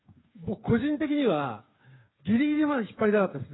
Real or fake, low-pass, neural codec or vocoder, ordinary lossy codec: fake; 7.2 kHz; codec, 16 kHz, 8 kbps, FreqCodec, smaller model; AAC, 16 kbps